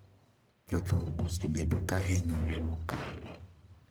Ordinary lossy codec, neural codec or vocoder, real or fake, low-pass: none; codec, 44.1 kHz, 1.7 kbps, Pupu-Codec; fake; none